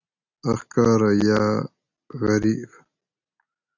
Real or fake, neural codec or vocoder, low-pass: real; none; 7.2 kHz